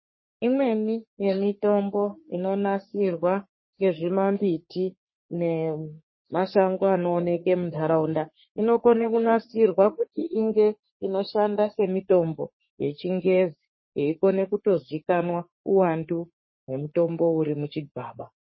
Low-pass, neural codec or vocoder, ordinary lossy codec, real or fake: 7.2 kHz; codec, 44.1 kHz, 3.4 kbps, Pupu-Codec; MP3, 24 kbps; fake